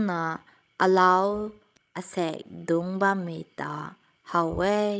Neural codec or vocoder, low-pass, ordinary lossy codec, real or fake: codec, 16 kHz, 16 kbps, FreqCodec, larger model; none; none; fake